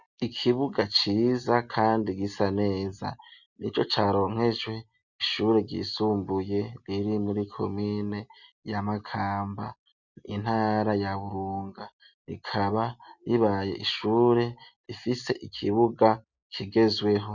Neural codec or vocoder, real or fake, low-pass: none; real; 7.2 kHz